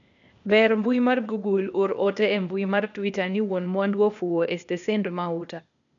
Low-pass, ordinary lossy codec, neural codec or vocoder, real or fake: 7.2 kHz; none; codec, 16 kHz, 0.8 kbps, ZipCodec; fake